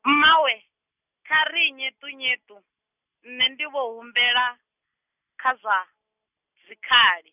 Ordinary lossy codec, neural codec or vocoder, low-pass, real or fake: none; none; 3.6 kHz; real